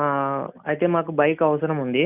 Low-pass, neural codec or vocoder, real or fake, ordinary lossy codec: 3.6 kHz; none; real; none